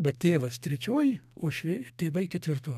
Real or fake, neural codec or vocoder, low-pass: fake; codec, 32 kHz, 1.9 kbps, SNAC; 14.4 kHz